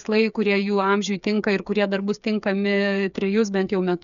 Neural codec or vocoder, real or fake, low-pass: codec, 16 kHz, 8 kbps, FreqCodec, smaller model; fake; 7.2 kHz